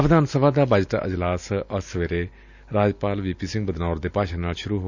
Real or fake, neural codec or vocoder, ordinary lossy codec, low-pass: real; none; none; 7.2 kHz